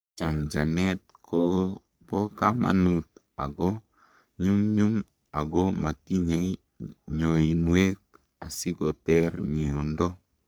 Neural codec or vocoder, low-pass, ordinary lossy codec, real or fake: codec, 44.1 kHz, 3.4 kbps, Pupu-Codec; none; none; fake